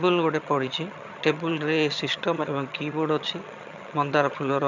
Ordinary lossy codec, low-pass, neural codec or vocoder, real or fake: none; 7.2 kHz; vocoder, 22.05 kHz, 80 mel bands, HiFi-GAN; fake